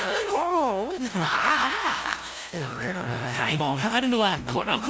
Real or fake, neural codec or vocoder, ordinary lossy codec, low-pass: fake; codec, 16 kHz, 0.5 kbps, FunCodec, trained on LibriTTS, 25 frames a second; none; none